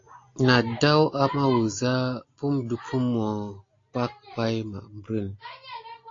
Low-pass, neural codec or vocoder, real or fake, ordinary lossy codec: 7.2 kHz; none; real; AAC, 32 kbps